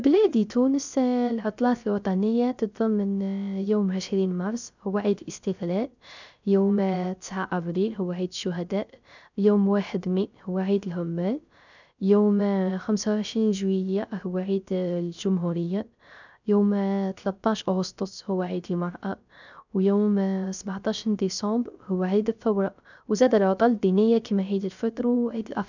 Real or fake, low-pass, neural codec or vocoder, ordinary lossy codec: fake; 7.2 kHz; codec, 16 kHz, 0.3 kbps, FocalCodec; none